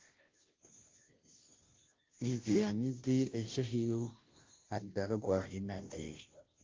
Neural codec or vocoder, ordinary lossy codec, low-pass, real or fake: codec, 16 kHz, 0.5 kbps, FunCodec, trained on Chinese and English, 25 frames a second; Opus, 32 kbps; 7.2 kHz; fake